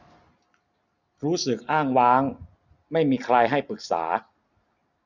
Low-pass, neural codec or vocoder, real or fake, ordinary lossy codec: 7.2 kHz; none; real; none